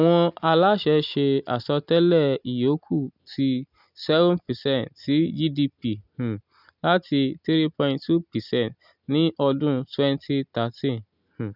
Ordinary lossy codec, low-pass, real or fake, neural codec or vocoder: none; 5.4 kHz; real; none